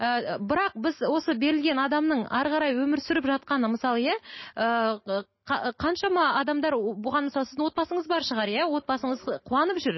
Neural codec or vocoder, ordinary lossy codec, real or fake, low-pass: none; MP3, 24 kbps; real; 7.2 kHz